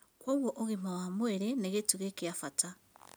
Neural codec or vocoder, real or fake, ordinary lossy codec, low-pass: none; real; none; none